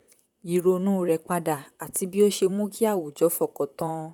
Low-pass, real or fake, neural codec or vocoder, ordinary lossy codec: 19.8 kHz; fake; vocoder, 44.1 kHz, 128 mel bands, Pupu-Vocoder; none